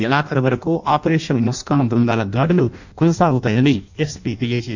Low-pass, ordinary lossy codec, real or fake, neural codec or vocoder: 7.2 kHz; none; fake; codec, 16 kHz in and 24 kHz out, 0.6 kbps, FireRedTTS-2 codec